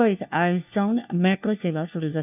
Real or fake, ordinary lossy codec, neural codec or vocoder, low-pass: fake; none; codec, 16 kHz, 1 kbps, FunCodec, trained on LibriTTS, 50 frames a second; 3.6 kHz